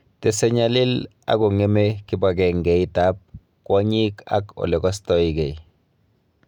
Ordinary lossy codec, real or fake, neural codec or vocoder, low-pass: none; real; none; 19.8 kHz